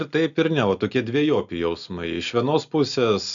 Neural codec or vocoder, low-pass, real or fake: none; 7.2 kHz; real